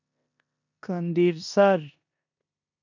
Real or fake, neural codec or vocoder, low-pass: fake; codec, 16 kHz in and 24 kHz out, 0.9 kbps, LongCat-Audio-Codec, four codebook decoder; 7.2 kHz